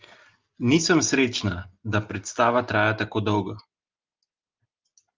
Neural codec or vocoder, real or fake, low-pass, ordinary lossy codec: none; real; 7.2 kHz; Opus, 24 kbps